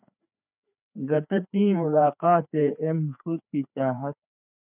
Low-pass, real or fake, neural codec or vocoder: 3.6 kHz; fake; codec, 16 kHz, 2 kbps, FreqCodec, larger model